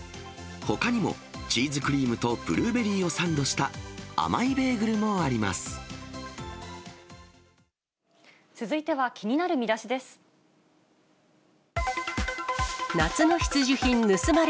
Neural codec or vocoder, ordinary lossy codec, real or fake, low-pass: none; none; real; none